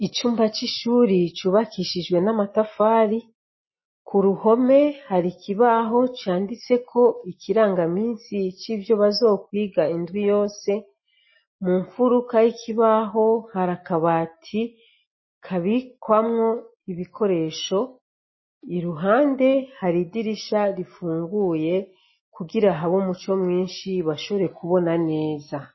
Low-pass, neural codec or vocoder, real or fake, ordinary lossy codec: 7.2 kHz; none; real; MP3, 24 kbps